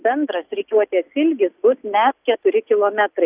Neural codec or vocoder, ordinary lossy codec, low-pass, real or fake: none; Opus, 64 kbps; 3.6 kHz; real